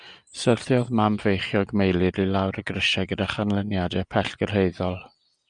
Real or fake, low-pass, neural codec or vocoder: real; 9.9 kHz; none